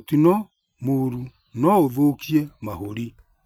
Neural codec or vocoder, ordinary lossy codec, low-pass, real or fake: none; none; none; real